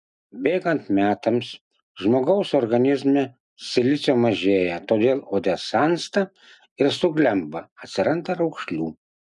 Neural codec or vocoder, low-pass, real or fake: none; 10.8 kHz; real